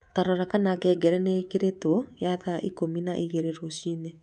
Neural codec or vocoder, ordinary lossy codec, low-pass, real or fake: codec, 24 kHz, 3.1 kbps, DualCodec; none; 10.8 kHz; fake